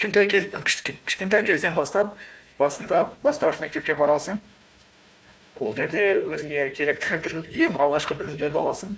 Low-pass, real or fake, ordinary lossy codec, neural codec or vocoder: none; fake; none; codec, 16 kHz, 1 kbps, FunCodec, trained on Chinese and English, 50 frames a second